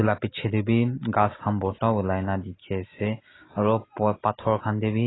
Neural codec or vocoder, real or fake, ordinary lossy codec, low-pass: none; real; AAC, 16 kbps; 7.2 kHz